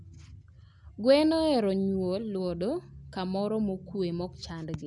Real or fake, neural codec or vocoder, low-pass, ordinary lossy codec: real; none; 10.8 kHz; none